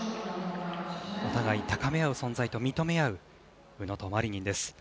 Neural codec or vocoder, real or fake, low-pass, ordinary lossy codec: none; real; none; none